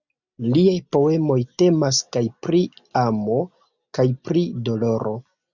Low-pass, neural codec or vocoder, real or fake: 7.2 kHz; none; real